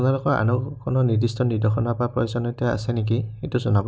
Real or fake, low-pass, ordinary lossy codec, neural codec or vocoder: real; none; none; none